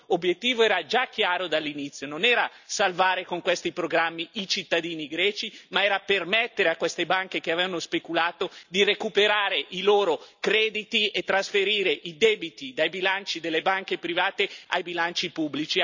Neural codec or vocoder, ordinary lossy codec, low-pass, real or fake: none; none; 7.2 kHz; real